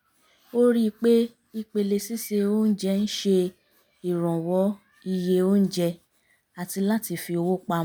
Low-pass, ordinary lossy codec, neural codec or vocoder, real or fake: none; none; none; real